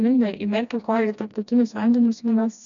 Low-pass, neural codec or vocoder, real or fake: 7.2 kHz; codec, 16 kHz, 1 kbps, FreqCodec, smaller model; fake